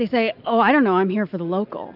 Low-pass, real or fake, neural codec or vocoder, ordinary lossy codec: 5.4 kHz; real; none; AAC, 48 kbps